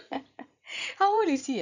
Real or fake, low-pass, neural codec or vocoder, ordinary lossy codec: fake; 7.2 kHz; vocoder, 44.1 kHz, 80 mel bands, Vocos; none